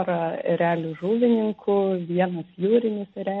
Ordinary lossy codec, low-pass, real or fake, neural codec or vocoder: MP3, 32 kbps; 10.8 kHz; real; none